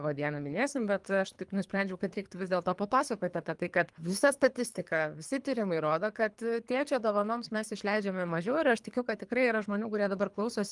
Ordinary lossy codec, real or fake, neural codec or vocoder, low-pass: Opus, 32 kbps; fake; codec, 24 kHz, 3 kbps, HILCodec; 10.8 kHz